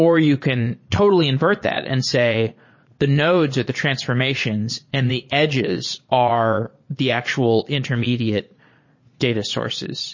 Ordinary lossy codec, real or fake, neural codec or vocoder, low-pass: MP3, 32 kbps; fake; vocoder, 22.05 kHz, 80 mel bands, Vocos; 7.2 kHz